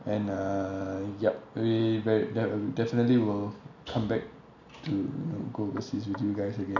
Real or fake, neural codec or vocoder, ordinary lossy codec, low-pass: real; none; none; 7.2 kHz